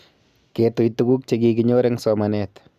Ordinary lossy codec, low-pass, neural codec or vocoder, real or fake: none; 14.4 kHz; none; real